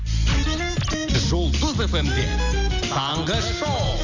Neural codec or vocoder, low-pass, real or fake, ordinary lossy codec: none; 7.2 kHz; real; none